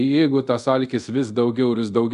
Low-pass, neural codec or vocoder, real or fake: 10.8 kHz; codec, 24 kHz, 0.5 kbps, DualCodec; fake